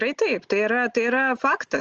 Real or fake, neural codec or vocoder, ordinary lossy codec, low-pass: real; none; Opus, 24 kbps; 7.2 kHz